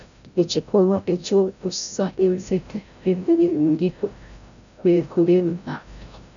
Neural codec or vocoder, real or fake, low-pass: codec, 16 kHz, 0.5 kbps, FreqCodec, larger model; fake; 7.2 kHz